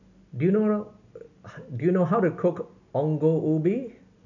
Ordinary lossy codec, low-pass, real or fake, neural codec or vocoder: none; 7.2 kHz; real; none